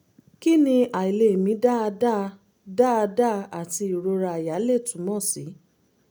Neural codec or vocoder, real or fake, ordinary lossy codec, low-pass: none; real; none; none